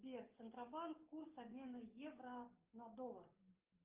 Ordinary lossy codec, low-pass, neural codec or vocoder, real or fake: Opus, 24 kbps; 3.6 kHz; codec, 44.1 kHz, 7.8 kbps, DAC; fake